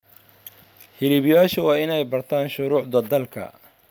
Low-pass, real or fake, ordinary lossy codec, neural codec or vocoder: none; real; none; none